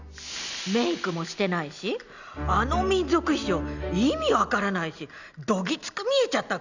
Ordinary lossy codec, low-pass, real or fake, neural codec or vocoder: none; 7.2 kHz; real; none